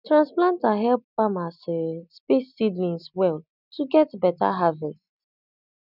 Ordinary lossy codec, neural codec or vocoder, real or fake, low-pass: none; none; real; 5.4 kHz